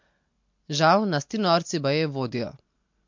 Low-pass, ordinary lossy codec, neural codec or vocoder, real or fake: 7.2 kHz; MP3, 48 kbps; none; real